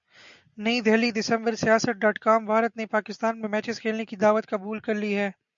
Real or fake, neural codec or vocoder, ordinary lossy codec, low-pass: real; none; MP3, 64 kbps; 7.2 kHz